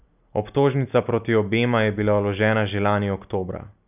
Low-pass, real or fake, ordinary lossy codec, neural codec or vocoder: 3.6 kHz; real; none; none